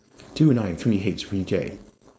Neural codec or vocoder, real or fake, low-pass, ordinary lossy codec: codec, 16 kHz, 4.8 kbps, FACodec; fake; none; none